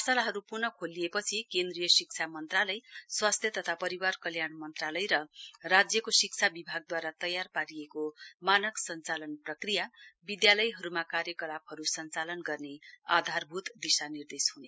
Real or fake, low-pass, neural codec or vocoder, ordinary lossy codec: real; none; none; none